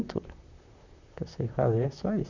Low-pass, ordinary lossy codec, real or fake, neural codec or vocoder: 7.2 kHz; none; fake; vocoder, 44.1 kHz, 128 mel bands, Pupu-Vocoder